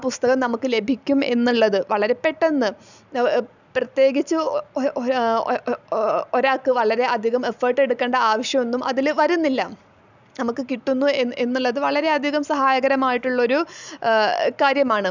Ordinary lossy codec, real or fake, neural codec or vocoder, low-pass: none; real; none; 7.2 kHz